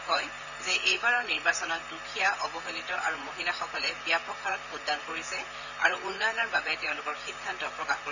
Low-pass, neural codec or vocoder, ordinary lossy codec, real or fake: 7.2 kHz; vocoder, 44.1 kHz, 128 mel bands, Pupu-Vocoder; none; fake